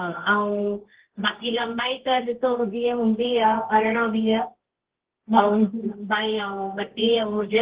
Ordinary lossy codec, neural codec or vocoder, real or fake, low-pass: Opus, 16 kbps; codec, 24 kHz, 0.9 kbps, WavTokenizer, medium music audio release; fake; 3.6 kHz